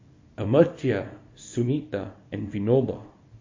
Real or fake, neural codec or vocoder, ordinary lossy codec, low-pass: fake; codec, 24 kHz, 0.9 kbps, WavTokenizer, small release; MP3, 32 kbps; 7.2 kHz